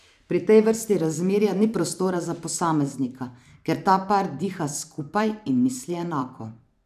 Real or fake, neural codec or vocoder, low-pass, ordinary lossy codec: fake; vocoder, 44.1 kHz, 128 mel bands every 512 samples, BigVGAN v2; 14.4 kHz; none